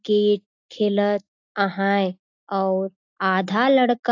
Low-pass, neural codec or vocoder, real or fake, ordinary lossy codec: 7.2 kHz; none; real; AAC, 48 kbps